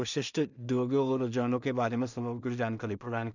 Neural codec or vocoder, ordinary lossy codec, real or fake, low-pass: codec, 16 kHz in and 24 kHz out, 0.4 kbps, LongCat-Audio-Codec, two codebook decoder; none; fake; 7.2 kHz